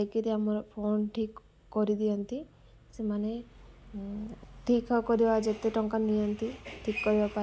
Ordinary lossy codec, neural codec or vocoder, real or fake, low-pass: none; none; real; none